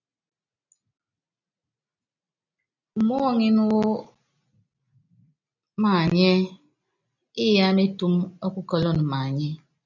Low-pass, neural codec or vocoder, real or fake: 7.2 kHz; none; real